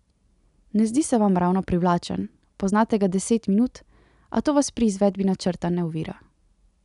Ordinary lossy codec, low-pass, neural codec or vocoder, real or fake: none; 10.8 kHz; none; real